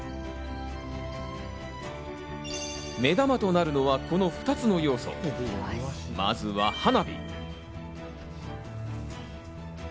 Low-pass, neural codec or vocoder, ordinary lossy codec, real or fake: none; none; none; real